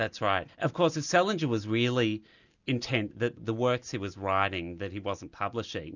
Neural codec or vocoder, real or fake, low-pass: none; real; 7.2 kHz